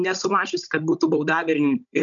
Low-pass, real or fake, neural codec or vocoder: 7.2 kHz; fake; codec, 16 kHz, 16 kbps, FunCodec, trained on Chinese and English, 50 frames a second